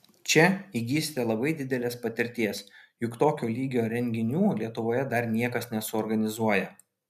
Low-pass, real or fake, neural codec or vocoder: 14.4 kHz; real; none